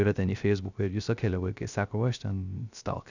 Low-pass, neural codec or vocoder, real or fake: 7.2 kHz; codec, 16 kHz, 0.3 kbps, FocalCodec; fake